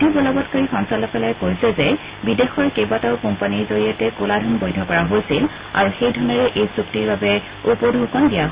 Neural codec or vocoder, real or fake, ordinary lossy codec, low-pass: none; real; Opus, 64 kbps; 3.6 kHz